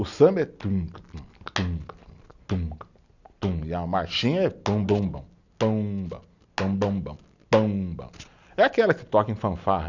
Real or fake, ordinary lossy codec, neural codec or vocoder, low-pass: real; none; none; 7.2 kHz